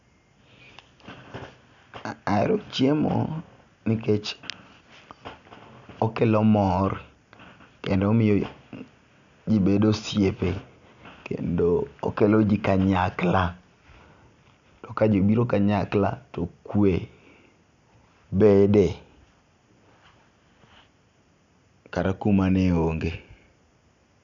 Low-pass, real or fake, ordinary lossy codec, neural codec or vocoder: 7.2 kHz; real; none; none